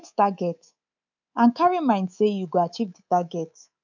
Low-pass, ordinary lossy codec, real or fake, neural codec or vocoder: 7.2 kHz; none; fake; codec, 24 kHz, 3.1 kbps, DualCodec